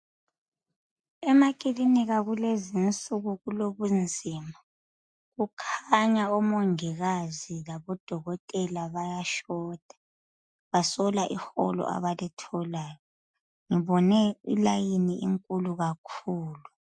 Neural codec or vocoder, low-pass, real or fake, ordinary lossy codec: none; 9.9 kHz; real; MP3, 64 kbps